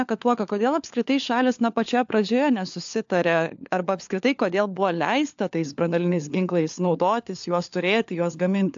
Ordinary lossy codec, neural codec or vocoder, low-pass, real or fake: AAC, 64 kbps; codec, 16 kHz, 4 kbps, FunCodec, trained on LibriTTS, 50 frames a second; 7.2 kHz; fake